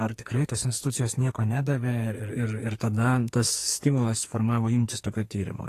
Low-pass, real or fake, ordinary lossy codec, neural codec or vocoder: 14.4 kHz; fake; AAC, 48 kbps; codec, 44.1 kHz, 2.6 kbps, SNAC